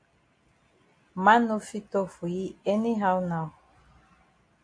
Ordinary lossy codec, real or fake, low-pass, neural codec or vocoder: AAC, 48 kbps; real; 9.9 kHz; none